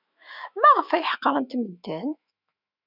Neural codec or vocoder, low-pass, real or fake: autoencoder, 48 kHz, 128 numbers a frame, DAC-VAE, trained on Japanese speech; 5.4 kHz; fake